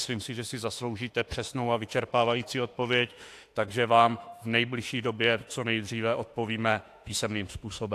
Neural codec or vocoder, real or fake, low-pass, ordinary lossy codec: autoencoder, 48 kHz, 32 numbers a frame, DAC-VAE, trained on Japanese speech; fake; 14.4 kHz; AAC, 64 kbps